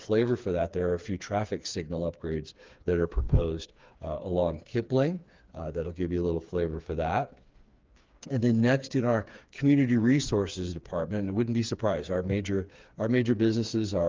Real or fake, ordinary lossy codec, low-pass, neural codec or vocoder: fake; Opus, 32 kbps; 7.2 kHz; codec, 16 kHz, 4 kbps, FreqCodec, smaller model